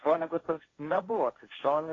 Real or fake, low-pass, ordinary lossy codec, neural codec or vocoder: fake; 7.2 kHz; AAC, 32 kbps; codec, 16 kHz, 1.1 kbps, Voila-Tokenizer